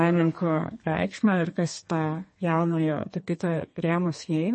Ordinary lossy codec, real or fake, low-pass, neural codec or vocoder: MP3, 32 kbps; fake; 10.8 kHz; codec, 32 kHz, 1.9 kbps, SNAC